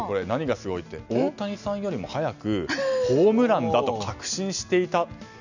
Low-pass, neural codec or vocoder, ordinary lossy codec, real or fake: 7.2 kHz; none; none; real